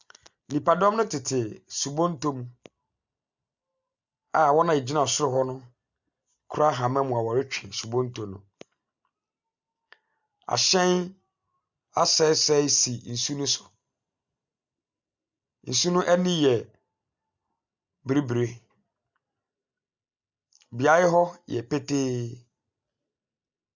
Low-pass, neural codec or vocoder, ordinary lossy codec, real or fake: 7.2 kHz; none; Opus, 64 kbps; real